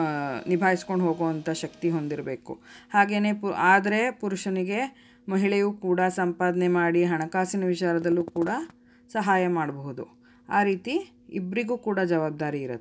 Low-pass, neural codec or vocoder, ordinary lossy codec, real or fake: none; none; none; real